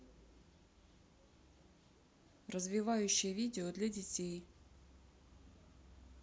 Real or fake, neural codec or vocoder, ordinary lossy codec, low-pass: real; none; none; none